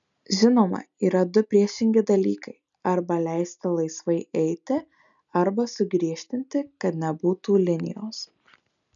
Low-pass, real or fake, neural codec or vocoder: 7.2 kHz; real; none